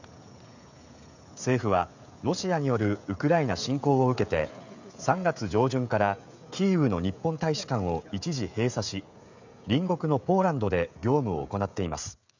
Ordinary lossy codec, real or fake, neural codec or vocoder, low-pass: none; fake; codec, 16 kHz, 16 kbps, FreqCodec, smaller model; 7.2 kHz